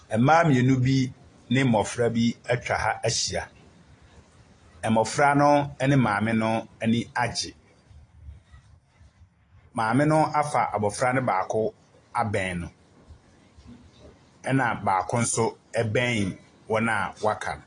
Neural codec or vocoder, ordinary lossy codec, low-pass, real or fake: none; AAC, 32 kbps; 9.9 kHz; real